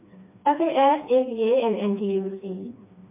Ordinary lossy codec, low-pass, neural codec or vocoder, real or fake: MP3, 32 kbps; 3.6 kHz; codec, 16 kHz, 2 kbps, FreqCodec, smaller model; fake